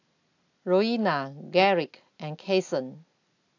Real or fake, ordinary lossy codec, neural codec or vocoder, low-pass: real; AAC, 48 kbps; none; 7.2 kHz